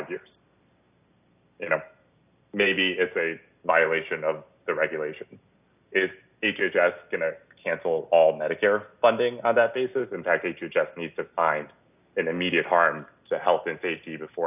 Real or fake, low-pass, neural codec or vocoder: real; 3.6 kHz; none